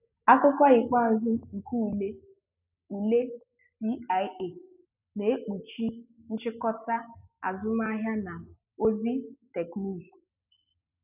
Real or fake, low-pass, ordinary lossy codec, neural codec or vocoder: real; 3.6 kHz; none; none